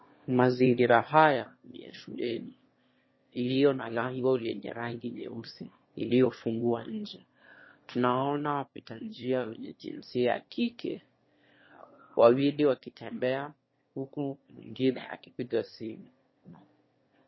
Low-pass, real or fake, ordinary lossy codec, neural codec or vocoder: 7.2 kHz; fake; MP3, 24 kbps; autoencoder, 22.05 kHz, a latent of 192 numbers a frame, VITS, trained on one speaker